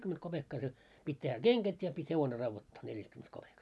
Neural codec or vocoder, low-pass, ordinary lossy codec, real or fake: none; none; none; real